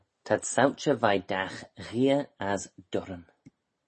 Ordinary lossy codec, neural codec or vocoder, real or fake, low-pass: MP3, 32 kbps; none; real; 10.8 kHz